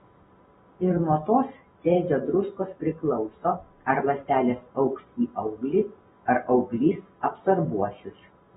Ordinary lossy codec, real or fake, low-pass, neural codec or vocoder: AAC, 16 kbps; real; 19.8 kHz; none